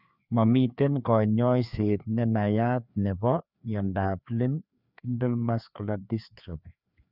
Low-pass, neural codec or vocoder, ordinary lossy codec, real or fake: 5.4 kHz; codec, 16 kHz, 2 kbps, FreqCodec, larger model; none; fake